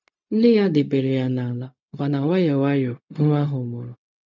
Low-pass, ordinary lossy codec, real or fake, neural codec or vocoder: 7.2 kHz; none; fake; codec, 16 kHz, 0.4 kbps, LongCat-Audio-Codec